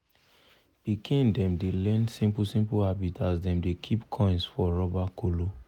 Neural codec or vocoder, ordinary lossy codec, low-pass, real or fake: none; none; 19.8 kHz; real